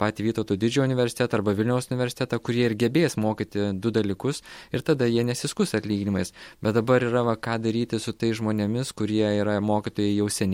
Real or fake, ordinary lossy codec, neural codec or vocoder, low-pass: real; MP3, 64 kbps; none; 19.8 kHz